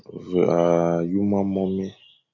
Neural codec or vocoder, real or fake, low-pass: none; real; 7.2 kHz